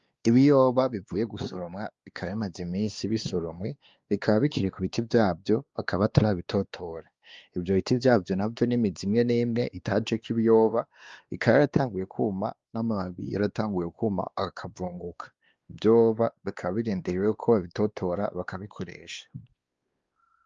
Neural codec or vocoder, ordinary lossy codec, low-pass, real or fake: codec, 16 kHz, 2 kbps, X-Codec, WavLM features, trained on Multilingual LibriSpeech; Opus, 32 kbps; 7.2 kHz; fake